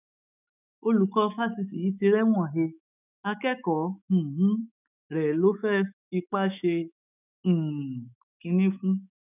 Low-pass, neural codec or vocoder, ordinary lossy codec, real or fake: 3.6 kHz; autoencoder, 48 kHz, 128 numbers a frame, DAC-VAE, trained on Japanese speech; none; fake